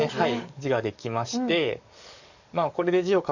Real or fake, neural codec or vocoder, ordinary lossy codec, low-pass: fake; codec, 44.1 kHz, 7.8 kbps, DAC; none; 7.2 kHz